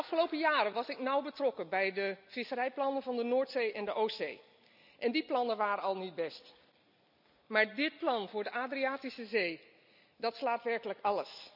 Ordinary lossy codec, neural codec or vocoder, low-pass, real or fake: none; none; 5.4 kHz; real